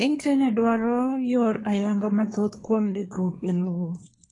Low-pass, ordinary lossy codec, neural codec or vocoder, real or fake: 10.8 kHz; AAC, 32 kbps; codec, 24 kHz, 1 kbps, SNAC; fake